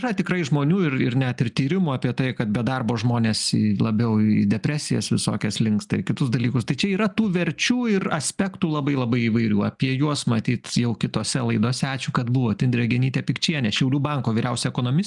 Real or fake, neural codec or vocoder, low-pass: real; none; 10.8 kHz